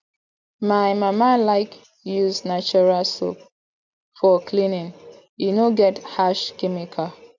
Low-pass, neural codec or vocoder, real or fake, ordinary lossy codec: 7.2 kHz; none; real; none